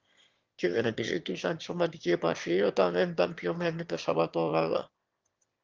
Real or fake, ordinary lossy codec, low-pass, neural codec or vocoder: fake; Opus, 32 kbps; 7.2 kHz; autoencoder, 22.05 kHz, a latent of 192 numbers a frame, VITS, trained on one speaker